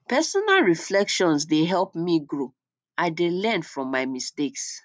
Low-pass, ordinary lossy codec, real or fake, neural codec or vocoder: none; none; real; none